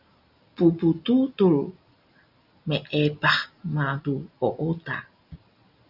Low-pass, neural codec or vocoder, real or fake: 5.4 kHz; none; real